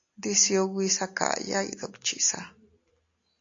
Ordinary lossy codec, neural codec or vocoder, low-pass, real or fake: AAC, 48 kbps; none; 7.2 kHz; real